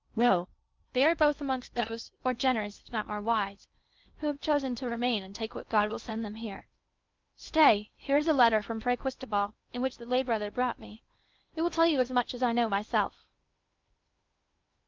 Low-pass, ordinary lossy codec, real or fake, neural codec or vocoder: 7.2 kHz; Opus, 24 kbps; fake; codec, 16 kHz in and 24 kHz out, 0.8 kbps, FocalCodec, streaming, 65536 codes